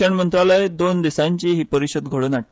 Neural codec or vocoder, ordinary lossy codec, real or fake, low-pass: codec, 16 kHz, 16 kbps, FreqCodec, smaller model; none; fake; none